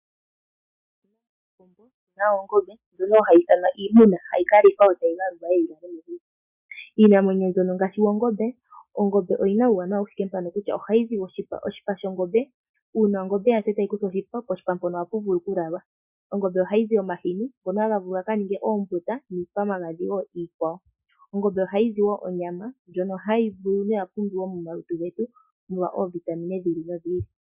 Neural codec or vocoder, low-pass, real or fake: none; 3.6 kHz; real